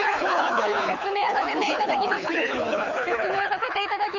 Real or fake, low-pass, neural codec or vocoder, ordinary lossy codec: fake; 7.2 kHz; codec, 24 kHz, 6 kbps, HILCodec; none